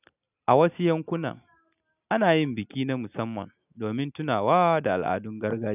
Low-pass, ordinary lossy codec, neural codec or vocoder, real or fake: 3.6 kHz; none; none; real